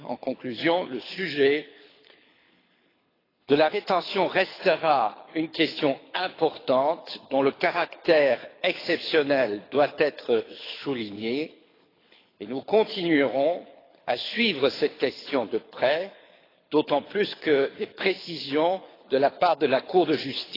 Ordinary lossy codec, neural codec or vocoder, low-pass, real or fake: AAC, 24 kbps; codec, 24 kHz, 6 kbps, HILCodec; 5.4 kHz; fake